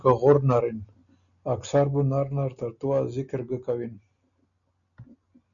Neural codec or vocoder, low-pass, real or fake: none; 7.2 kHz; real